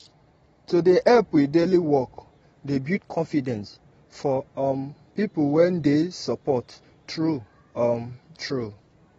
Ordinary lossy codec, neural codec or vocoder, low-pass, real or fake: AAC, 24 kbps; none; 10.8 kHz; real